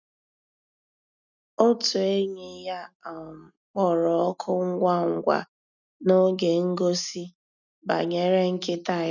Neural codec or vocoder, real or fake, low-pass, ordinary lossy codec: none; real; 7.2 kHz; none